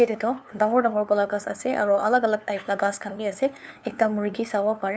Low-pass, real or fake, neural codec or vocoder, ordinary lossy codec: none; fake; codec, 16 kHz, 2 kbps, FunCodec, trained on LibriTTS, 25 frames a second; none